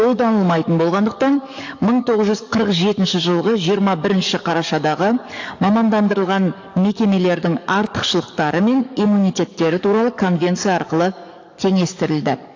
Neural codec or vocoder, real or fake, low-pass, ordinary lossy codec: codec, 44.1 kHz, 7.8 kbps, DAC; fake; 7.2 kHz; AAC, 48 kbps